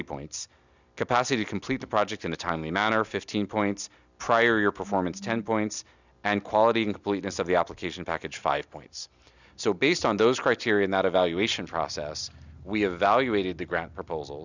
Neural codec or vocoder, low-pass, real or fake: none; 7.2 kHz; real